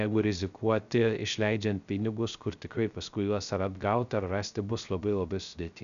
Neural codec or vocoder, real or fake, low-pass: codec, 16 kHz, 0.3 kbps, FocalCodec; fake; 7.2 kHz